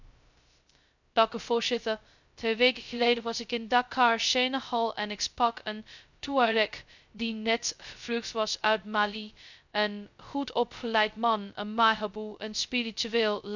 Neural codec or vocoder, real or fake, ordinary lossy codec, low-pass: codec, 16 kHz, 0.2 kbps, FocalCodec; fake; none; 7.2 kHz